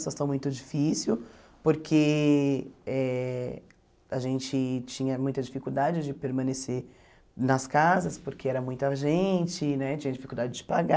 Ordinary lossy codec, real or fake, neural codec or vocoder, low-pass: none; real; none; none